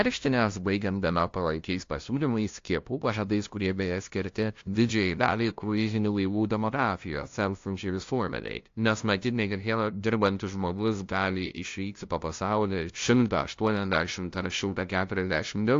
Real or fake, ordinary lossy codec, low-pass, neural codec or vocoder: fake; AAC, 48 kbps; 7.2 kHz; codec, 16 kHz, 0.5 kbps, FunCodec, trained on LibriTTS, 25 frames a second